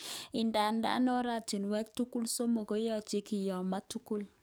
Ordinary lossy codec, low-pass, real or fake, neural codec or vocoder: none; none; fake; codec, 44.1 kHz, 7.8 kbps, DAC